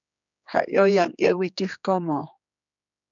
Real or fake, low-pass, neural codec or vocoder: fake; 7.2 kHz; codec, 16 kHz, 2 kbps, X-Codec, HuBERT features, trained on general audio